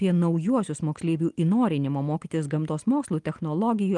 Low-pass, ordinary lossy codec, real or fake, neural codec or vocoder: 10.8 kHz; Opus, 24 kbps; real; none